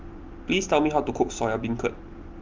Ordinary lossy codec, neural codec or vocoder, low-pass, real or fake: Opus, 24 kbps; none; 7.2 kHz; real